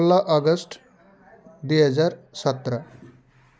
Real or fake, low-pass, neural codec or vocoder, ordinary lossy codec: real; none; none; none